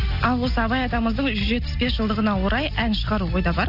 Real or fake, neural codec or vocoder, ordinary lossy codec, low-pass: real; none; none; 5.4 kHz